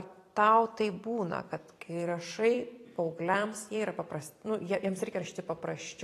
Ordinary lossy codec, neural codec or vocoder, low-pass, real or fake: AAC, 48 kbps; vocoder, 44.1 kHz, 128 mel bands every 256 samples, BigVGAN v2; 14.4 kHz; fake